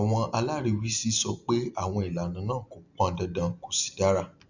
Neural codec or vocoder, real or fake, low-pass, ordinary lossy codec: none; real; 7.2 kHz; none